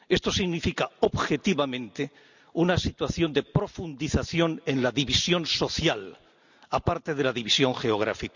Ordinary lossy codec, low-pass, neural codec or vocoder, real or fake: none; 7.2 kHz; none; real